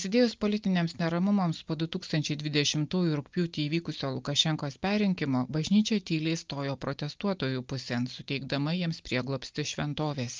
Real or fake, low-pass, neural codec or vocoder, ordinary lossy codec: real; 7.2 kHz; none; Opus, 24 kbps